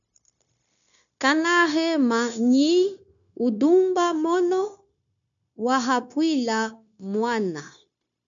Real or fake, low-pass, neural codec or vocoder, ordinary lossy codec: fake; 7.2 kHz; codec, 16 kHz, 0.9 kbps, LongCat-Audio-Codec; MP3, 96 kbps